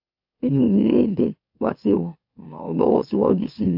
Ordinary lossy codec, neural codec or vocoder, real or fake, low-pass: AAC, 48 kbps; autoencoder, 44.1 kHz, a latent of 192 numbers a frame, MeloTTS; fake; 5.4 kHz